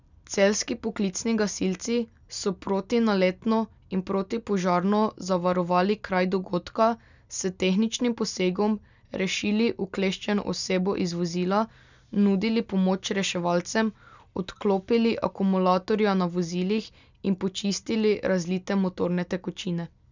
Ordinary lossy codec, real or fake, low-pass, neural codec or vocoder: none; real; 7.2 kHz; none